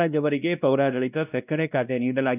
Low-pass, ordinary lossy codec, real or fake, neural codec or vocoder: 3.6 kHz; none; fake; codec, 16 kHz, 1 kbps, X-Codec, WavLM features, trained on Multilingual LibriSpeech